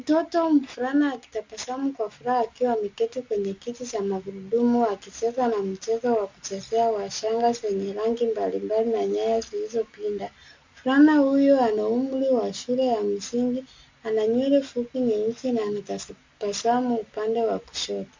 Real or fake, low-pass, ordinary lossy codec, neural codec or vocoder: real; 7.2 kHz; MP3, 64 kbps; none